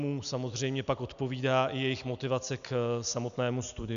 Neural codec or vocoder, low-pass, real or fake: none; 7.2 kHz; real